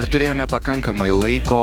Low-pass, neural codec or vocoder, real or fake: 19.8 kHz; codec, 44.1 kHz, 2.6 kbps, DAC; fake